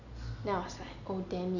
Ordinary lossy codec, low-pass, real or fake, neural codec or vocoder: none; 7.2 kHz; real; none